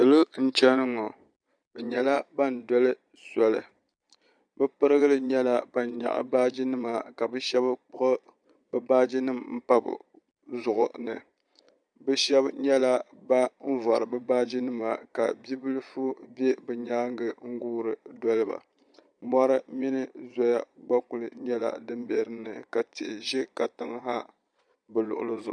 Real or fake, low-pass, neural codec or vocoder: fake; 9.9 kHz; vocoder, 22.05 kHz, 80 mel bands, Vocos